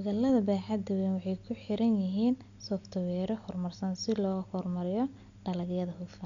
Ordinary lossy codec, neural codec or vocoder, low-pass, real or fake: MP3, 64 kbps; none; 7.2 kHz; real